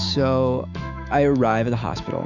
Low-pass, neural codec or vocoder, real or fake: 7.2 kHz; autoencoder, 48 kHz, 128 numbers a frame, DAC-VAE, trained on Japanese speech; fake